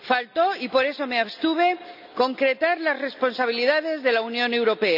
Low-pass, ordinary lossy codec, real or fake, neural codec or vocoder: 5.4 kHz; none; real; none